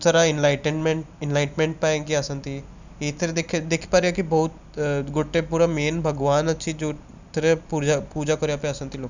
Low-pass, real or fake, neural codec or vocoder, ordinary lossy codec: 7.2 kHz; real; none; none